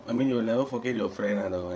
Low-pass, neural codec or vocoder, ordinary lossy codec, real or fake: none; codec, 16 kHz, 8 kbps, FreqCodec, larger model; none; fake